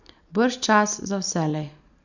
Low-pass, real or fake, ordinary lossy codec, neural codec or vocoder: 7.2 kHz; real; none; none